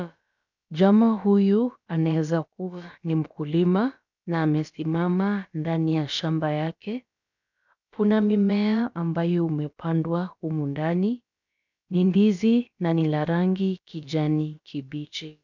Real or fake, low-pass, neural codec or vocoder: fake; 7.2 kHz; codec, 16 kHz, about 1 kbps, DyCAST, with the encoder's durations